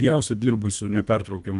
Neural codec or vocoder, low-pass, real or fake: codec, 24 kHz, 1.5 kbps, HILCodec; 10.8 kHz; fake